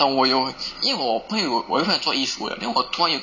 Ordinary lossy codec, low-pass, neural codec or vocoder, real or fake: none; 7.2 kHz; none; real